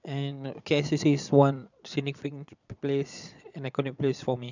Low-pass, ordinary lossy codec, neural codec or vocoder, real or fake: 7.2 kHz; none; vocoder, 22.05 kHz, 80 mel bands, Vocos; fake